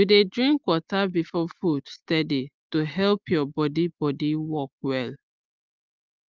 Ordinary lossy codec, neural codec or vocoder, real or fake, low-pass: Opus, 32 kbps; none; real; 7.2 kHz